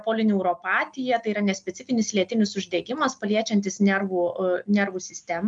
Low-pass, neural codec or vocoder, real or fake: 9.9 kHz; none; real